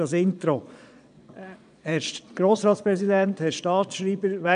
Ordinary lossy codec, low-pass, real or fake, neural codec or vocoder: none; 9.9 kHz; fake; vocoder, 22.05 kHz, 80 mel bands, Vocos